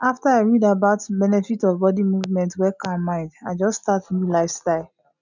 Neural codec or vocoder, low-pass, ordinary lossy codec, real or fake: none; 7.2 kHz; none; real